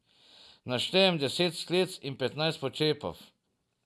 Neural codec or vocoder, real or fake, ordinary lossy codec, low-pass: none; real; none; none